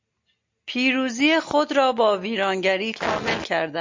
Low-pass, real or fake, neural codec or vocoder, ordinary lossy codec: 7.2 kHz; real; none; MP3, 48 kbps